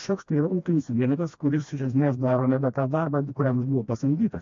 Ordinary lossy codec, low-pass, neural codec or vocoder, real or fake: MP3, 48 kbps; 7.2 kHz; codec, 16 kHz, 1 kbps, FreqCodec, smaller model; fake